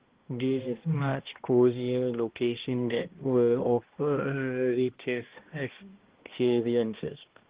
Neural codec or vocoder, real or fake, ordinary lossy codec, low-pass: codec, 16 kHz, 1 kbps, X-Codec, HuBERT features, trained on balanced general audio; fake; Opus, 16 kbps; 3.6 kHz